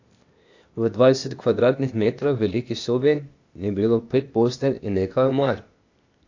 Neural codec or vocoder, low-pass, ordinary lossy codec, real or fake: codec, 16 kHz, 0.8 kbps, ZipCodec; 7.2 kHz; AAC, 48 kbps; fake